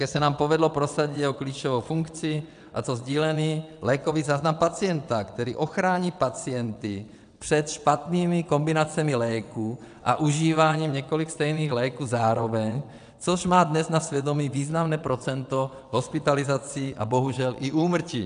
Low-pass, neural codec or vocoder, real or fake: 9.9 kHz; vocoder, 22.05 kHz, 80 mel bands, WaveNeXt; fake